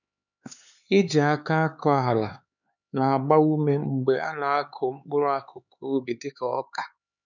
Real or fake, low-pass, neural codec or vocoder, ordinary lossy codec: fake; 7.2 kHz; codec, 16 kHz, 4 kbps, X-Codec, HuBERT features, trained on LibriSpeech; none